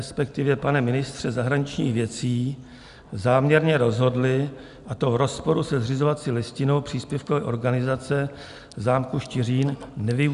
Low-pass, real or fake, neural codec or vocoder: 10.8 kHz; real; none